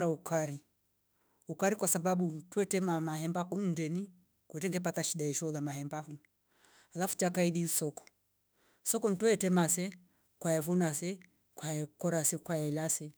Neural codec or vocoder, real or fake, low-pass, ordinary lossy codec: autoencoder, 48 kHz, 32 numbers a frame, DAC-VAE, trained on Japanese speech; fake; none; none